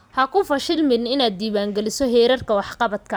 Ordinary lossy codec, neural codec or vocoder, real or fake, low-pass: none; vocoder, 44.1 kHz, 128 mel bands every 256 samples, BigVGAN v2; fake; none